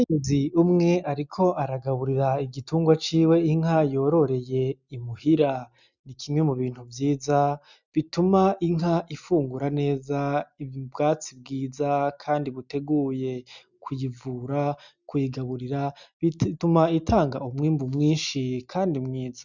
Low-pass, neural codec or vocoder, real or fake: 7.2 kHz; none; real